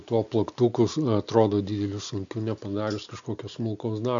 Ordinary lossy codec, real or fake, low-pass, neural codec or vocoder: MP3, 64 kbps; real; 7.2 kHz; none